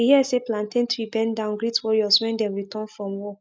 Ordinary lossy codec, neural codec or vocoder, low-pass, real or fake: none; none; none; real